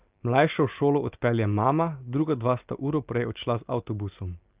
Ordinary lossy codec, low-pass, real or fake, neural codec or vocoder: Opus, 24 kbps; 3.6 kHz; real; none